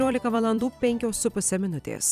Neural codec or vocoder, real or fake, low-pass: vocoder, 44.1 kHz, 128 mel bands every 256 samples, BigVGAN v2; fake; 14.4 kHz